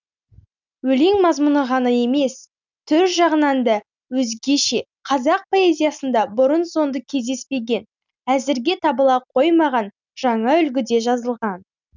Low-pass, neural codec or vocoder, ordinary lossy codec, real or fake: 7.2 kHz; none; none; real